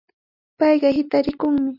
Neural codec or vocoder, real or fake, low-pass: none; real; 5.4 kHz